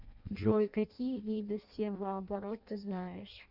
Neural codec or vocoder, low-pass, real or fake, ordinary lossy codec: codec, 16 kHz in and 24 kHz out, 0.6 kbps, FireRedTTS-2 codec; 5.4 kHz; fake; Opus, 64 kbps